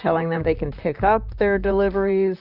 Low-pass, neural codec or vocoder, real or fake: 5.4 kHz; vocoder, 44.1 kHz, 128 mel bands, Pupu-Vocoder; fake